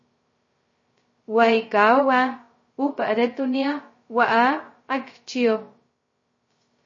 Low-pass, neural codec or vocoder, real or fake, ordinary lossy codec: 7.2 kHz; codec, 16 kHz, 0.2 kbps, FocalCodec; fake; MP3, 32 kbps